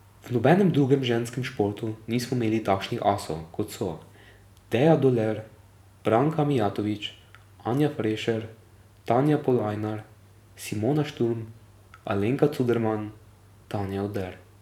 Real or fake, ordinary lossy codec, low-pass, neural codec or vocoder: fake; none; 19.8 kHz; vocoder, 44.1 kHz, 128 mel bands every 512 samples, BigVGAN v2